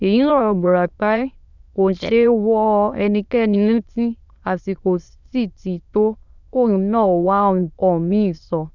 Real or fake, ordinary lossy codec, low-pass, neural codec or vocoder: fake; none; 7.2 kHz; autoencoder, 22.05 kHz, a latent of 192 numbers a frame, VITS, trained on many speakers